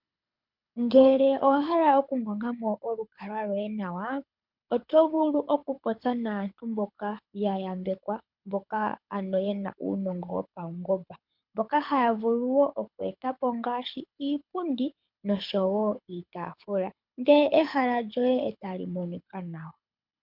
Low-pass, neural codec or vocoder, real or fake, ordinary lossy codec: 5.4 kHz; codec, 24 kHz, 6 kbps, HILCodec; fake; MP3, 48 kbps